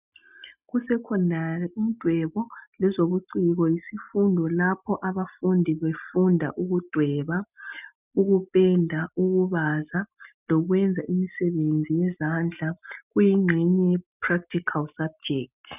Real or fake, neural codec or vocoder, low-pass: real; none; 3.6 kHz